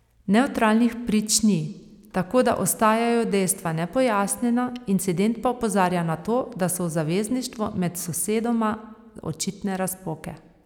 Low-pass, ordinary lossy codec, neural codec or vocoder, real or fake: 19.8 kHz; none; none; real